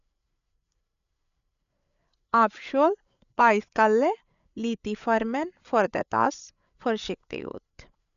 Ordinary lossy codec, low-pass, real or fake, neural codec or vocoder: none; 7.2 kHz; fake; codec, 16 kHz, 8 kbps, FreqCodec, larger model